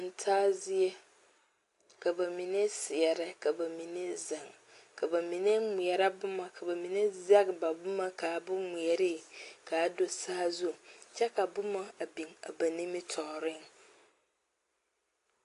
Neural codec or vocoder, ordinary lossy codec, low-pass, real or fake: none; AAC, 48 kbps; 10.8 kHz; real